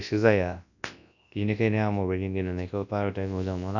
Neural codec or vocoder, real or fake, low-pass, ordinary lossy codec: codec, 24 kHz, 0.9 kbps, WavTokenizer, large speech release; fake; 7.2 kHz; none